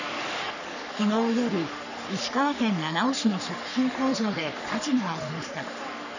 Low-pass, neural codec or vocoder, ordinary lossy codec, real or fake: 7.2 kHz; codec, 44.1 kHz, 3.4 kbps, Pupu-Codec; none; fake